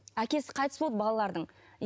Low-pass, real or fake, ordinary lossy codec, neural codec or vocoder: none; real; none; none